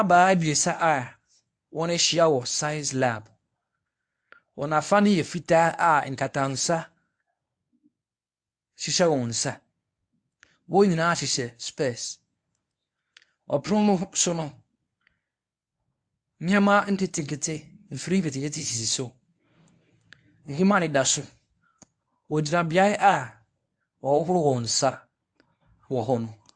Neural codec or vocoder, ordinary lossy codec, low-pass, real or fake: codec, 24 kHz, 0.9 kbps, WavTokenizer, medium speech release version 1; AAC, 64 kbps; 9.9 kHz; fake